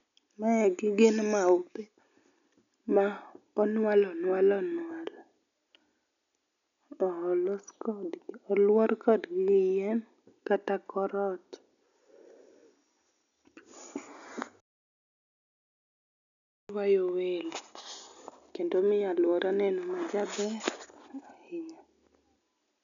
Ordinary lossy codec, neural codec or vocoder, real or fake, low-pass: none; none; real; 7.2 kHz